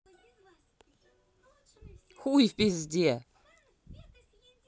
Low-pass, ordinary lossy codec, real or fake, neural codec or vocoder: none; none; real; none